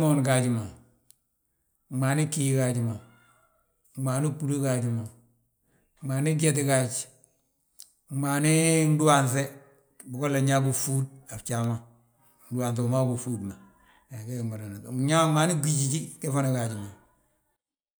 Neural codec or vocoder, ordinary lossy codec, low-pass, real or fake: none; none; none; real